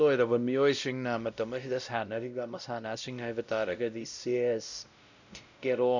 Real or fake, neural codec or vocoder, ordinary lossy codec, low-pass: fake; codec, 16 kHz, 0.5 kbps, X-Codec, WavLM features, trained on Multilingual LibriSpeech; none; 7.2 kHz